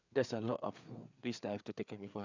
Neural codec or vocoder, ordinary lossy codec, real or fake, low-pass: codec, 16 kHz, 4 kbps, FreqCodec, larger model; none; fake; 7.2 kHz